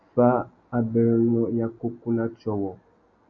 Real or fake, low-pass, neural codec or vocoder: real; 7.2 kHz; none